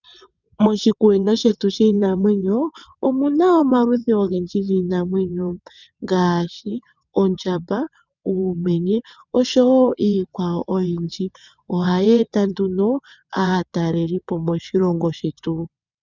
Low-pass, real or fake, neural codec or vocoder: 7.2 kHz; fake; vocoder, 22.05 kHz, 80 mel bands, WaveNeXt